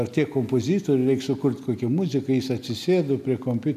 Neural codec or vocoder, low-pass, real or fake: none; 14.4 kHz; real